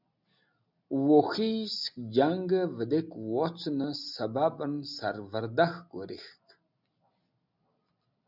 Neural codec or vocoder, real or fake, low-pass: none; real; 5.4 kHz